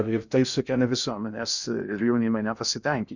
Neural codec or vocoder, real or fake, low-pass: codec, 16 kHz in and 24 kHz out, 0.6 kbps, FocalCodec, streaming, 2048 codes; fake; 7.2 kHz